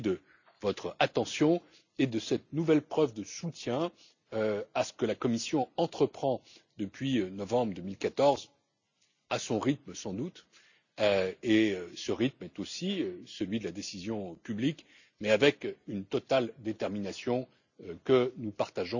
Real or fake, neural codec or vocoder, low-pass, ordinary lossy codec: real; none; 7.2 kHz; none